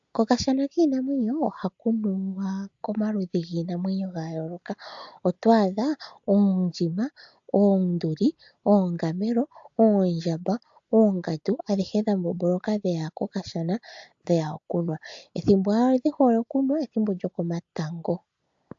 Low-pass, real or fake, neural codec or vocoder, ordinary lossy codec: 7.2 kHz; real; none; AAC, 64 kbps